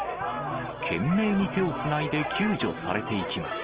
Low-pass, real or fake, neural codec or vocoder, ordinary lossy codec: 3.6 kHz; real; none; Opus, 16 kbps